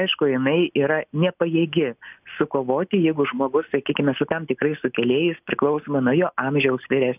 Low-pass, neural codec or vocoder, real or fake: 3.6 kHz; none; real